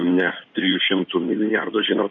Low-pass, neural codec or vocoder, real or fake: 9.9 kHz; codec, 16 kHz in and 24 kHz out, 2.2 kbps, FireRedTTS-2 codec; fake